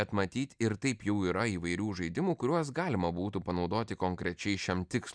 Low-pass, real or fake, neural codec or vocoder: 9.9 kHz; real; none